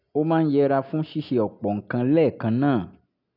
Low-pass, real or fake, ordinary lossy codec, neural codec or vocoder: 5.4 kHz; real; none; none